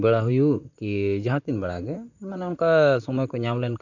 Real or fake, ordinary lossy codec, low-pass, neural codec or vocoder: fake; none; 7.2 kHz; vocoder, 44.1 kHz, 128 mel bands, Pupu-Vocoder